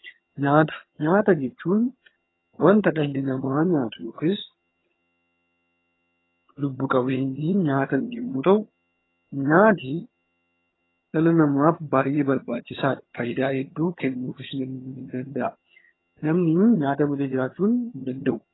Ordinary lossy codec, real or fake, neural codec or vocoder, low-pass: AAC, 16 kbps; fake; vocoder, 22.05 kHz, 80 mel bands, HiFi-GAN; 7.2 kHz